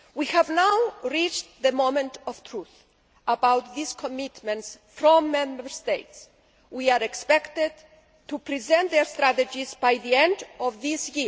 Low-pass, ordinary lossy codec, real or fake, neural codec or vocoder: none; none; real; none